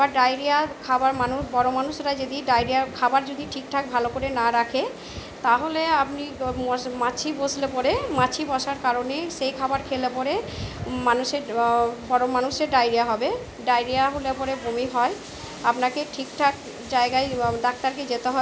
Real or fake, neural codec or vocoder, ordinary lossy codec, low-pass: real; none; none; none